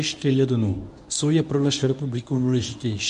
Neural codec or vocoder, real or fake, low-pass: codec, 24 kHz, 0.9 kbps, WavTokenizer, medium speech release version 1; fake; 10.8 kHz